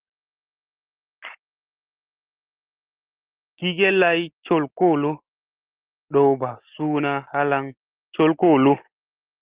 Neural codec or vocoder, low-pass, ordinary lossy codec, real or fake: none; 3.6 kHz; Opus, 16 kbps; real